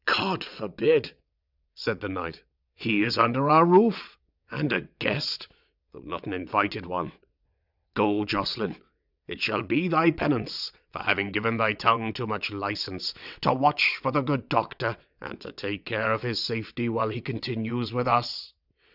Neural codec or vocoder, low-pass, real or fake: vocoder, 22.05 kHz, 80 mel bands, WaveNeXt; 5.4 kHz; fake